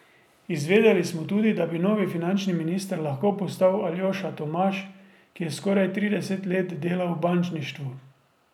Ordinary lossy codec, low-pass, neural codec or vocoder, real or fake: none; 19.8 kHz; none; real